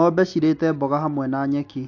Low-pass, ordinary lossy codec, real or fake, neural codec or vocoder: 7.2 kHz; AAC, 48 kbps; real; none